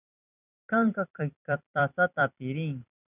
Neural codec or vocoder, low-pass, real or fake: vocoder, 44.1 kHz, 128 mel bands every 256 samples, BigVGAN v2; 3.6 kHz; fake